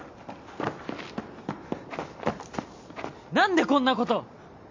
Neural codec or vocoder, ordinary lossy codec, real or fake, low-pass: none; MP3, 48 kbps; real; 7.2 kHz